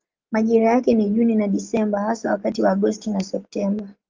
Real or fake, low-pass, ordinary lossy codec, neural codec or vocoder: real; 7.2 kHz; Opus, 32 kbps; none